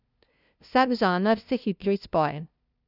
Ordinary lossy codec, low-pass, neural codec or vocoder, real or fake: none; 5.4 kHz; codec, 16 kHz, 0.5 kbps, FunCodec, trained on LibriTTS, 25 frames a second; fake